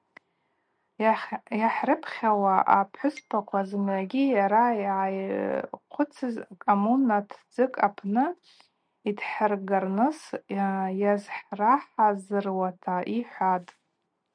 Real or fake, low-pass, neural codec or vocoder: real; 9.9 kHz; none